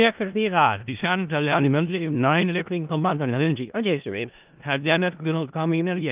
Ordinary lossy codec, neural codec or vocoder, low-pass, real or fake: Opus, 24 kbps; codec, 16 kHz in and 24 kHz out, 0.4 kbps, LongCat-Audio-Codec, four codebook decoder; 3.6 kHz; fake